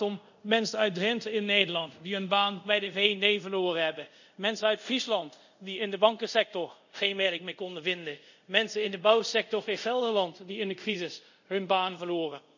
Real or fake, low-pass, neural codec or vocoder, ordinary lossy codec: fake; 7.2 kHz; codec, 24 kHz, 0.5 kbps, DualCodec; none